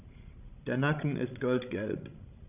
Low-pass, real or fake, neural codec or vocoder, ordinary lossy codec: 3.6 kHz; fake; codec, 16 kHz, 16 kbps, FreqCodec, larger model; none